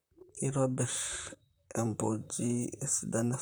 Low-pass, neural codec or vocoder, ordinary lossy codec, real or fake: none; vocoder, 44.1 kHz, 128 mel bands, Pupu-Vocoder; none; fake